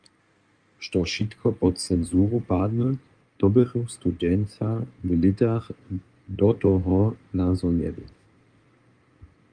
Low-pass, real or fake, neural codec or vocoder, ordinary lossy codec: 9.9 kHz; fake; codec, 16 kHz in and 24 kHz out, 2.2 kbps, FireRedTTS-2 codec; Opus, 32 kbps